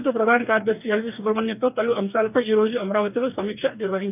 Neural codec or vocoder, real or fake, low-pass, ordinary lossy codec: codec, 44.1 kHz, 2.6 kbps, DAC; fake; 3.6 kHz; none